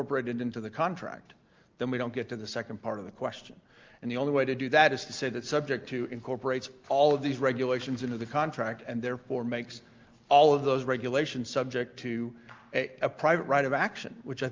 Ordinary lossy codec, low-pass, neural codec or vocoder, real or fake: Opus, 32 kbps; 7.2 kHz; none; real